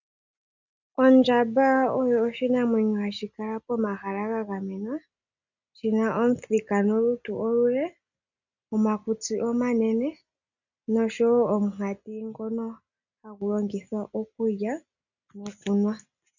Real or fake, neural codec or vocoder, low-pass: real; none; 7.2 kHz